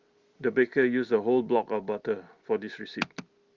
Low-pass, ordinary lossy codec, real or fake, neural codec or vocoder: 7.2 kHz; Opus, 32 kbps; real; none